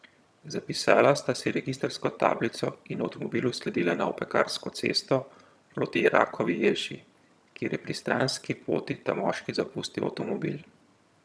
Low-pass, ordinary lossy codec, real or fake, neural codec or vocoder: none; none; fake; vocoder, 22.05 kHz, 80 mel bands, HiFi-GAN